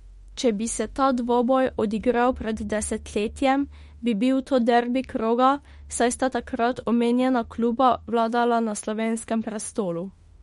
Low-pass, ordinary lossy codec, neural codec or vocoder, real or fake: 19.8 kHz; MP3, 48 kbps; autoencoder, 48 kHz, 32 numbers a frame, DAC-VAE, trained on Japanese speech; fake